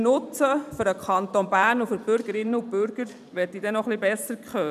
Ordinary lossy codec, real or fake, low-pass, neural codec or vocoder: none; fake; 14.4 kHz; vocoder, 44.1 kHz, 128 mel bands every 512 samples, BigVGAN v2